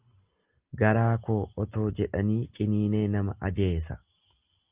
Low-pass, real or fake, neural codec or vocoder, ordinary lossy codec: 3.6 kHz; real; none; Opus, 24 kbps